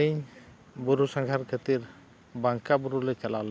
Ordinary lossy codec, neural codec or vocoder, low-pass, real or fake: none; none; none; real